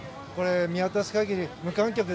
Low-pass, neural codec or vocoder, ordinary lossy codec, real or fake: none; none; none; real